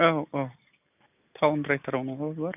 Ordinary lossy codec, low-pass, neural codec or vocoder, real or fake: none; 3.6 kHz; none; real